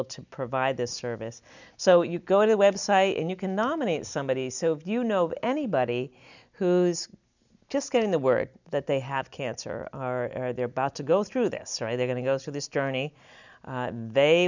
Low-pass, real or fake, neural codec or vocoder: 7.2 kHz; real; none